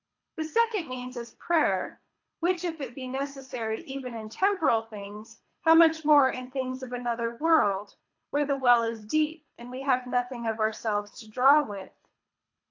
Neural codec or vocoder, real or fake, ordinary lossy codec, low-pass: codec, 24 kHz, 3 kbps, HILCodec; fake; AAC, 48 kbps; 7.2 kHz